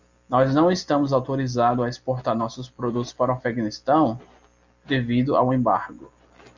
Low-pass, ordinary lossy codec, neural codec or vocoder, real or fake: 7.2 kHz; Opus, 64 kbps; none; real